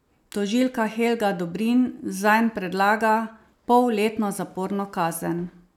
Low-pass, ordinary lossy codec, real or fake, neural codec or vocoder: 19.8 kHz; none; real; none